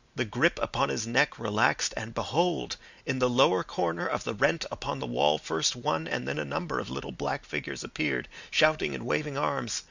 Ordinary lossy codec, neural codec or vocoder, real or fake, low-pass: Opus, 64 kbps; none; real; 7.2 kHz